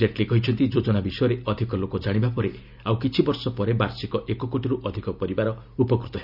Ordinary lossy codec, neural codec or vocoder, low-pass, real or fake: none; none; 5.4 kHz; real